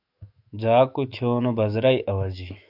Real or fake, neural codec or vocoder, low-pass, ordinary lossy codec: fake; autoencoder, 48 kHz, 128 numbers a frame, DAC-VAE, trained on Japanese speech; 5.4 kHz; AAC, 48 kbps